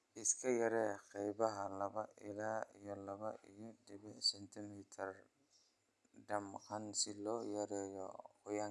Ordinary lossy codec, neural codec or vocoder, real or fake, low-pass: none; none; real; none